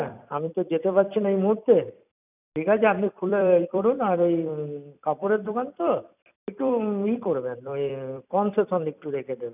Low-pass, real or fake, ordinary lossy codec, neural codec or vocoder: 3.6 kHz; fake; none; vocoder, 44.1 kHz, 128 mel bands every 256 samples, BigVGAN v2